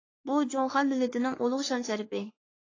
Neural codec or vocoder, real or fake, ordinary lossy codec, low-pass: codec, 44.1 kHz, 3.4 kbps, Pupu-Codec; fake; AAC, 32 kbps; 7.2 kHz